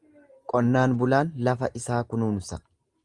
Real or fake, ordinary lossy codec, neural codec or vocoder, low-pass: real; Opus, 32 kbps; none; 10.8 kHz